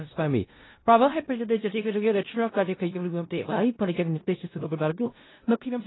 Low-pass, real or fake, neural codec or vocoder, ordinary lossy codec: 7.2 kHz; fake; codec, 16 kHz in and 24 kHz out, 0.4 kbps, LongCat-Audio-Codec, four codebook decoder; AAC, 16 kbps